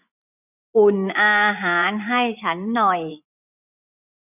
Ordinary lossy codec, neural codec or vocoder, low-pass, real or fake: none; vocoder, 24 kHz, 100 mel bands, Vocos; 3.6 kHz; fake